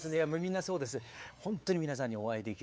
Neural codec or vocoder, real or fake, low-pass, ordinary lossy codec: codec, 16 kHz, 4 kbps, X-Codec, WavLM features, trained on Multilingual LibriSpeech; fake; none; none